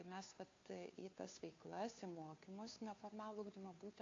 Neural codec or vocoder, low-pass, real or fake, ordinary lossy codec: codec, 16 kHz, 2 kbps, FunCodec, trained on Chinese and English, 25 frames a second; 7.2 kHz; fake; AAC, 32 kbps